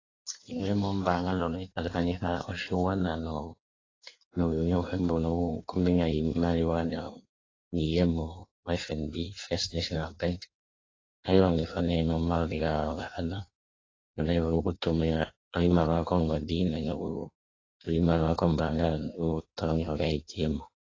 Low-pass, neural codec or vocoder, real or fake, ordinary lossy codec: 7.2 kHz; codec, 16 kHz in and 24 kHz out, 1.1 kbps, FireRedTTS-2 codec; fake; AAC, 32 kbps